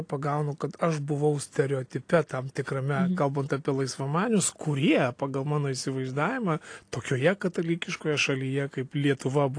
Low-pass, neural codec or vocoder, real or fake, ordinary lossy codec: 9.9 kHz; none; real; AAC, 48 kbps